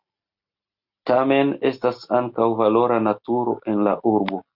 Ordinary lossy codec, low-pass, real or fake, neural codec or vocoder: Opus, 64 kbps; 5.4 kHz; real; none